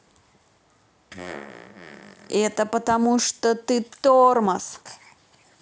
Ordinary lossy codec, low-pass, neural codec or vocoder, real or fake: none; none; none; real